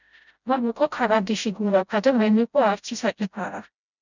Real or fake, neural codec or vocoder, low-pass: fake; codec, 16 kHz, 0.5 kbps, FreqCodec, smaller model; 7.2 kHz